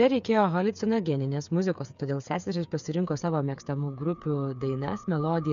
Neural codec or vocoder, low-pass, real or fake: codec, 16 kHz, 8 kbps, FreqCodec, smaller model; 7.2 kHz; fake